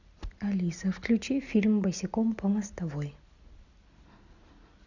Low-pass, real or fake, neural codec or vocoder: 7.2 kHz; real; none